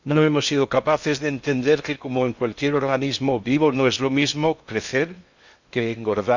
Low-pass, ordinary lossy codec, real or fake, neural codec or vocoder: 7.2 kHz; Opus, 64 kbps; fake; codec, 16 kHz in and 24 kHz out, 0.6 kbps, FocalCodec, streaming, 2048 codes